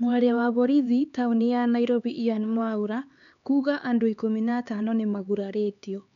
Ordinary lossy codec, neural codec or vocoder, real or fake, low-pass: none; codec, 16 kHz, 4 kbps, X-Codec, HuBERT features, trained on LibriSpeech; fake; 7.2 kHz